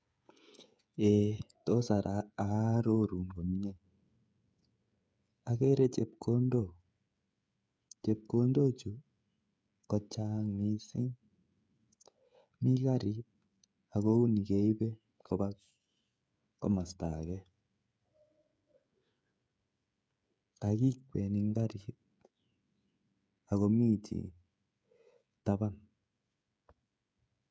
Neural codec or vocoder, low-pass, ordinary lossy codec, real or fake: codec, 16 kHz, 16 kbps, FreqCodec, smaller model; none; none; fake